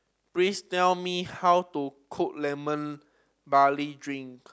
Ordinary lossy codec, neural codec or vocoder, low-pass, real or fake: none; none; none; real